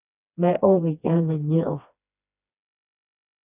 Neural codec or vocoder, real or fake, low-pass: codec, 16 kHz, 2 kbps, FreqCodec, smaller model; fake; 3.6 kHz